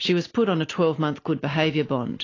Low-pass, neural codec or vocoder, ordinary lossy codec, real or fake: 7.2 kHz; none; AAC, 32 kbps; real